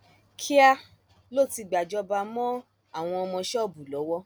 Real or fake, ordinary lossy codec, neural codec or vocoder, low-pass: real; none; none; none